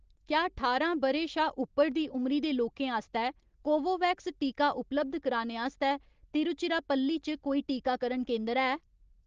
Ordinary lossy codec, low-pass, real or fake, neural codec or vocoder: Opus, 16 kbps; 7.2 kHz; real; none